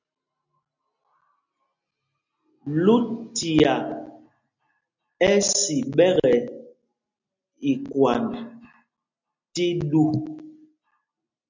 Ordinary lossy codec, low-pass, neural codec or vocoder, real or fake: MP3, 64 kbps; 7.2 kHz; none; real